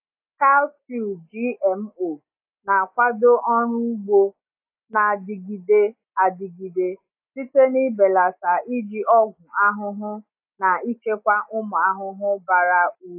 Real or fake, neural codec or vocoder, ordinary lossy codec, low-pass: real; none; AAC, 32 kbps; 3.6 kHz